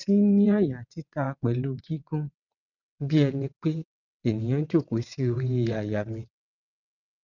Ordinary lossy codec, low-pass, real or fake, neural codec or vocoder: none; 7.2 kHz; fake; vocoder, 22.05 kHz, 80 mel bands, WaveNeXt